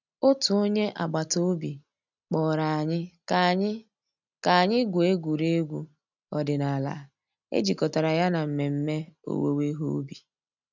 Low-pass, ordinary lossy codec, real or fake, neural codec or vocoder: 7.2 kHz; none; real; none